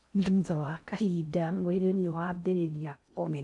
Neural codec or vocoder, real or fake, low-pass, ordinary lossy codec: codec, 16 kHz in and 24 kHz out, 0.6 kbps, FocalCodec, streaming, 4096 codes; fake; 10.8 kHz; none